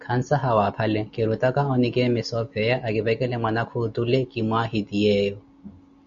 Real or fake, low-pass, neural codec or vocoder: real; 7.2 kHz; none